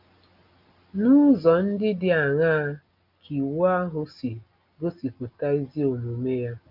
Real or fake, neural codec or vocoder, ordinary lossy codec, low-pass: real; none; none; 5.4 kHz